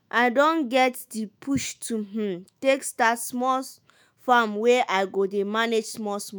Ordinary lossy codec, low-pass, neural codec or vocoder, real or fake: none; none; autoencoder, 48 kHz, 128 numbers a frame, DAC-VAE, trained on Japanese speech; fake